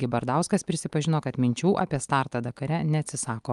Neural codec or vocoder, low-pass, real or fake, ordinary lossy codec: none; 10.8 kHz; real; Opus, 32 kbps